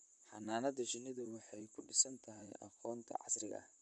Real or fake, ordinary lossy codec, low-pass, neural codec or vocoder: fake; none; 10.8 kHz; vocoder, 44.1 kHz, 128 mel bands, Pupu-Vocoder